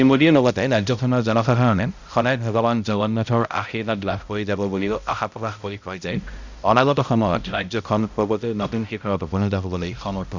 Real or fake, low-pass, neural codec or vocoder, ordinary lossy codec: fake; 7.2 kHz; codec, 16 kHz, 0.5 kbps, X-Codec, HuBERT features, trained on balanced general audio; Opus, 64 kbps